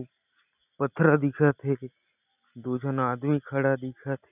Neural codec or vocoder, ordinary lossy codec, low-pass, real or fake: none; none; 3.6 kHz; real